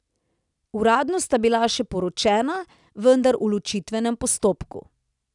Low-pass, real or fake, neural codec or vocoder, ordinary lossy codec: 10.8 kHz; real; none; none